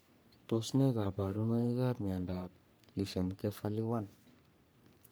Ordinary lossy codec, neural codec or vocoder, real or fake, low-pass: none; codec, 44.1 kHz, 3.4 kbps, Pupu-Codec; fake; none